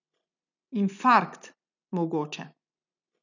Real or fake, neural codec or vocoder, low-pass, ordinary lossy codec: real; none; 7.2 kHz; none